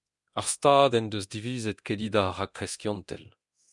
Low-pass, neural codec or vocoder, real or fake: 10.8 kHz; codec, 24 kHz, 0.9 kbps, DualCodec; fake